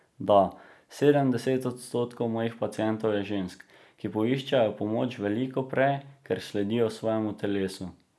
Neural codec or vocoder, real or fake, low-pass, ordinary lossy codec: none; real; none; none